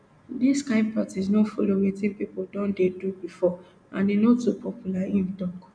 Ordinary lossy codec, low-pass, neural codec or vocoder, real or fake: none; 9.9 kHz; none; real